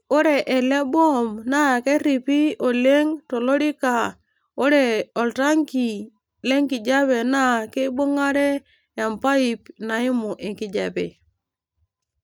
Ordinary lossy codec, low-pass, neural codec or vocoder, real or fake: none; none; none; real